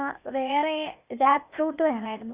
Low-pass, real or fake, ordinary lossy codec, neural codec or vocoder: 3.6 kHz; fake; Opus, 64 kbps; codec, 16 kHz, 0.8 kbps, ZipCodec